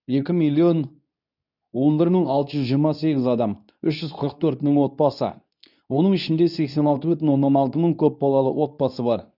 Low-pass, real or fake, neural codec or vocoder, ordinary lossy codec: 5.4 kHz; fake; codec, 24 kHz, 0.9 kbps, WavTokenizer, medium speech release version 2; none